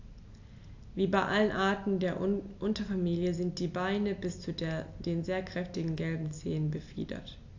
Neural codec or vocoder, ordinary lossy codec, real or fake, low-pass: none; AAC, 48 kbps; real; 7.2 kHz